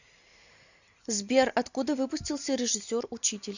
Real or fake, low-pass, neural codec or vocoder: real; 7.2 kHz; none